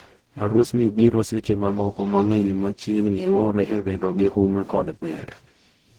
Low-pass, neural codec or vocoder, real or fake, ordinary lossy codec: 19.8 kHz; codec, 44.1 kHz, 0.9 kbps, DAC; fake; Opus, 16 kbps